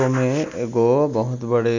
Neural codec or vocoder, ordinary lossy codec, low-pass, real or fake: none; none; 7.2 kHz; real